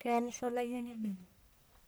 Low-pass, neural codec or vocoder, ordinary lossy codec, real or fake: none; codec, 44.1 kHz, 1.7 kbps, Pupu-Codec; none; fake